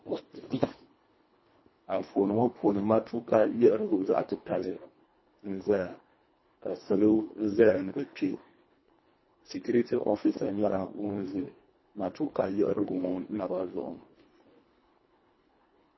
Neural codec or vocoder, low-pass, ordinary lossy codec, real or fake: codec, 24 kHz, 1.5 kbps, HILCodec; 7.2 kHz; MP3, 24 kbps; fake